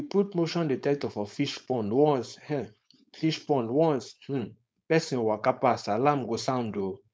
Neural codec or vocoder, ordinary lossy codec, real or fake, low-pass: codec, 16 kHz, 4.8 kbps, FACodec; none; fake; none